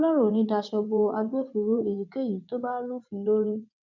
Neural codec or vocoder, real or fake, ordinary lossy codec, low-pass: vocoder, 22.05 kHz, 80 mel bands, WaveNeXt; fake; MP3, 64 kbps; 7.2 kHz